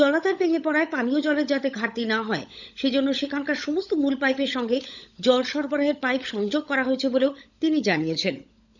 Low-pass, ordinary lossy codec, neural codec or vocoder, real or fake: 7.2 kHz; none; codec, 16 kHz, 16 kbps, FunCodec, trained on LibriTTS, 50 frames a second; fake